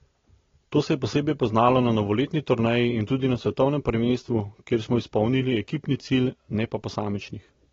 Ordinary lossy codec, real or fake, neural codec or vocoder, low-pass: AAC, 24 kbps; real; none; 7.2 kHz